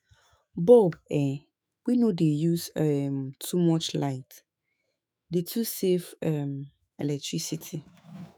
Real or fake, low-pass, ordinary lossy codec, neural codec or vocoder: fake; none; none; autoencoder, 48 kHz, 128 numbers a frame, DAC-VAE, trained on Japanese speech